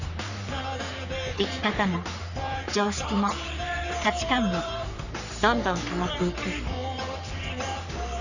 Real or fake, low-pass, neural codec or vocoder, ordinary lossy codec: fake; 7.2 kHz; codec, 44.1 kHz, 3.4 kbps, Pupu-Codec; none